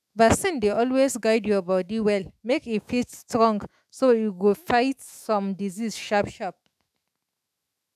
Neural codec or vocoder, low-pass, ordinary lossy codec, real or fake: autoencoder, 48 kHz, 128 numbers a frame, DAC-VAE, trained on Japanese speech; 14.4 kHz; none; fake